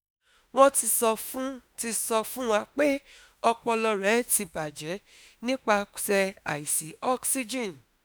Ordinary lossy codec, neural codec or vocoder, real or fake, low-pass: none; autoencoder, 48 kHz, 32 numbers a frame, DAC-VAE, trained on Japanese speech; fake; none